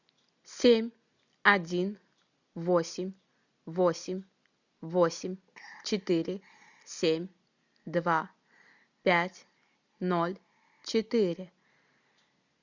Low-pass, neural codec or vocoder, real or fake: 7.2 kHz; none; real